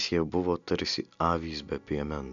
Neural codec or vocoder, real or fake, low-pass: none; real; 7.2 kHz